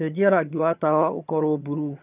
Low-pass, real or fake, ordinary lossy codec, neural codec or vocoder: 3.6 kHz; fake; none; vocoder, 22.05 kHz, 80 mel bands, HiFi-GAN